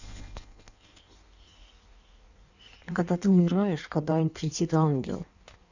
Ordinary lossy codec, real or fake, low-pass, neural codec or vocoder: none; fake; 7.2 kHz; codec, 16 kHz in and 24 kHz out, 1.1 kbps, FireRedTTS-2 codec